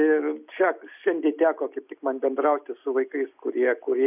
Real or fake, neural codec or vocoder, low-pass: real; none; 3.6 kHz